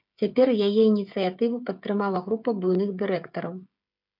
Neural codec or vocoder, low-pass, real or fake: codec, 16 kHz, 8 kbps, FreqCodec, smaller model; 5.4 kHz; fake